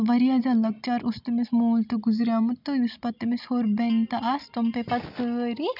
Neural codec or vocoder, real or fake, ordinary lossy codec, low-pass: none; real; none; 5.4 kHz